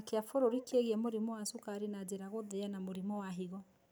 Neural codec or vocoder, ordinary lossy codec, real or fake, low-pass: none; none; real; none